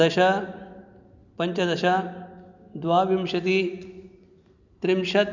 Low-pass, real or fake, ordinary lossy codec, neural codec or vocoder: 7.2 kHz; real; none; none